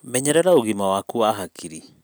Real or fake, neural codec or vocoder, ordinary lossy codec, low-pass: real; none; none; none